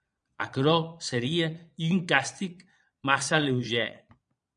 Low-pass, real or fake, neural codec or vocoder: 9.9 kHz; fake; vocoder, 22.05 kHz, 80 mel bands, Vocos